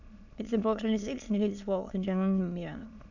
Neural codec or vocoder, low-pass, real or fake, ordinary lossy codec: autoencoder, 22.05 kHz, a latent of 192 numbers a frame, VITS, trained on many speakers; 7.2 kHz; fake; none